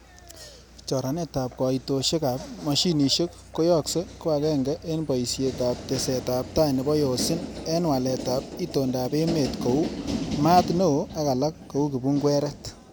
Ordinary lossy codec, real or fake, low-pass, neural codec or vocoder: none; real; none; none